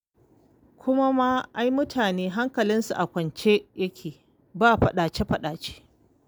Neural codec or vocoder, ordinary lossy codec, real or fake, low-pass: none; none; real; none